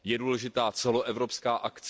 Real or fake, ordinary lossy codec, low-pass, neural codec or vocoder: real; none; none; none